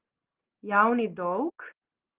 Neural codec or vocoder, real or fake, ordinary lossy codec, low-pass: none; real; Opus, 16 kbps; 3.6 kHz